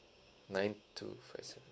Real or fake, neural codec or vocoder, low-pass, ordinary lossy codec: fake; codec, 16 kHz, 6 kbps, DAC; none; none